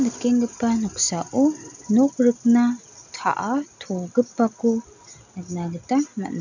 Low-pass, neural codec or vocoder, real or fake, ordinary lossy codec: 7.2 kHz; none; real; none